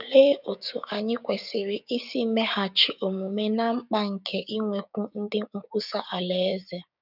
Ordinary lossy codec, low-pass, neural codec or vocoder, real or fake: none; 5.4 kHz; codec, 16 kHz, 6 kbps, DAC; fake